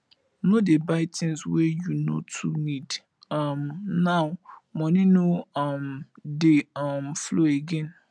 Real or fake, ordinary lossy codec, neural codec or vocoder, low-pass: real; none; none; none